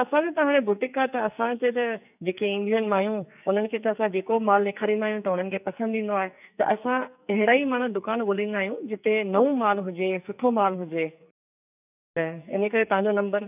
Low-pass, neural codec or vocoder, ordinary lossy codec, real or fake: 3.6 kHz; codec, 44.1 kHz, 2.6 kbps, SNAC; none; fake